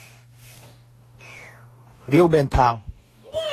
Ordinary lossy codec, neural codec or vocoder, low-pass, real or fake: AAC, 48 kbps; codec, 44.1 kHz, 2.6 kbps, DAC; 14.4 kHz; fake